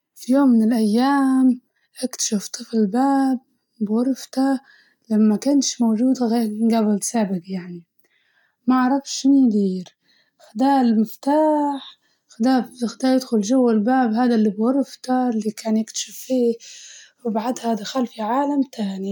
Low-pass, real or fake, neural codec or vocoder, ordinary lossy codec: 19.8 kHz; real; none; none